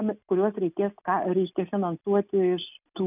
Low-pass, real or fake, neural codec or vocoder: 3.6 kHz; real; none